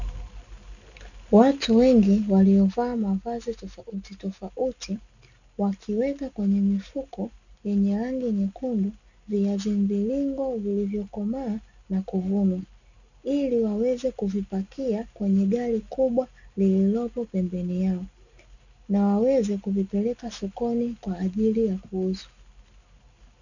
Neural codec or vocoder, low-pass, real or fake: none; 7.2 kHz; real